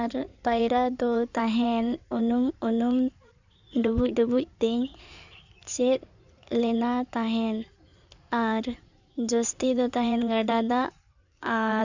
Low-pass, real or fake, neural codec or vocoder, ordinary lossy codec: 7.2 kHz; fake; codec, 16 kHz in and 24 kHz out, 2.2 kbps, FireRedTTS-2 codec; none